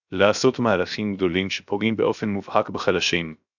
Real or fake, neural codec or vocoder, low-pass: fake; codec, 16 kHz, 0.7 kbps, FocalCodec; 7.2 kHz